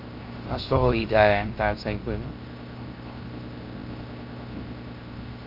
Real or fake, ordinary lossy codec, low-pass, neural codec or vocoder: fake; Opus, 24 kbps; 5.4 kHz; codec, 16 kHz, 0.3 kbps, FocalCodec